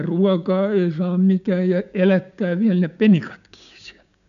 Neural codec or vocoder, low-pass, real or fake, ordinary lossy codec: codec, 16 kHz, 6 kbps, DAC; 7.2 kHz; fake; none